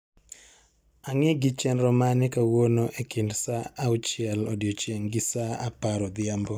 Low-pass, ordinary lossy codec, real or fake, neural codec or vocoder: none; none; real; none